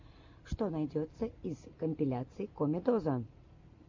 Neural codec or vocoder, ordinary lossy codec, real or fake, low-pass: none; MP3, 48 kbps; real; 7.2 kHz